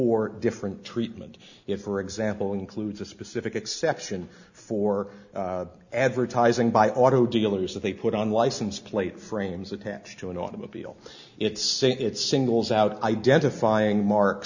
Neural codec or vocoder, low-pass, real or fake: none; 7.2 kHz; real